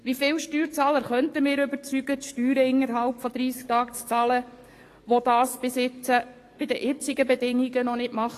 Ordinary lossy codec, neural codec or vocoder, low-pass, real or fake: AAC, 48 kbps; codec, 44.1 kHz, 7.8 kbps, DAC; 14.4 kHz; fake